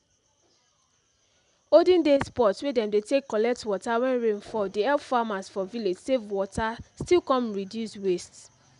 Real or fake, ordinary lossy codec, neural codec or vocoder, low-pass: real; none; none; 10.8 kHz